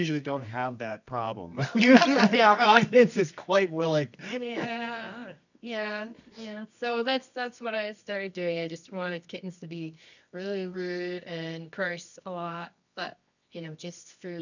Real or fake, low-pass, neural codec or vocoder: fake; 7.2 kHz; codec, 24 kHz, 0.9 kbps, WavTokenizer, medium music audio release